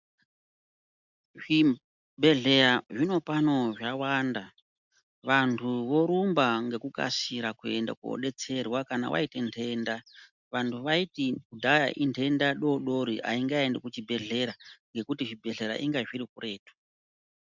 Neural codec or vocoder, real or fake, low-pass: none; real; 7.2 kHz